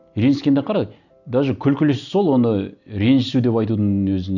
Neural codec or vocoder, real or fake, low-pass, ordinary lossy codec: none; real; 7.2 kHz; none